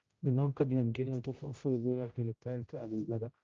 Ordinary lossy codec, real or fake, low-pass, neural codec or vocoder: Opus, 24 kbps; fake; 7.2 kHz; codec, 16 kHz, 0.5 kbps, X-Codec, HuBERT features, trained on general audio